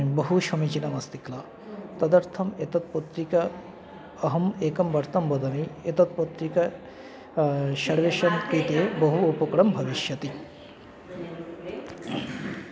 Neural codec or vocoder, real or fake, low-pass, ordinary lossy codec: none; real; none; none